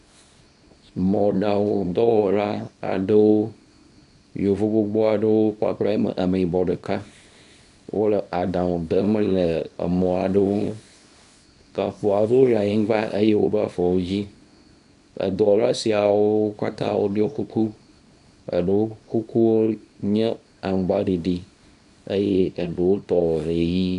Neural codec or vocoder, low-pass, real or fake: codec, 24 kHz, 0.9 kbps, WavTokenizer, small release; 10.8 kHz; fake